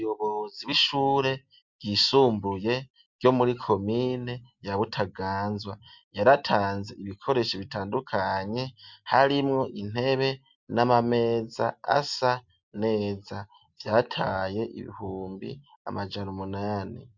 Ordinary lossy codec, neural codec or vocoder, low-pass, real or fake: MP3, 64 kbps; none; 7.2 kHz; real